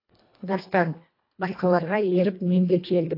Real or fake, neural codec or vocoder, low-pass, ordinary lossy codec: fake; codec, 24 kHz, 1.5 kbps, HILCodec; 5.4 kHz; AAC, 32 kbps